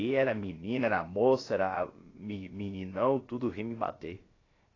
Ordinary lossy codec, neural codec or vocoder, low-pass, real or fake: AAC, 32 kbps; codec, 16 kHz, about 1 kbps, DyCAST, with the encoder's durations; 7.2 kHz; fake